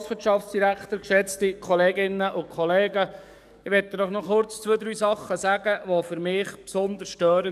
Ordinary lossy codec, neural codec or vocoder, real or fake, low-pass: none; codec, 44.1 kHz, 7.8 kbps, DAC; fake; 14.4 kHz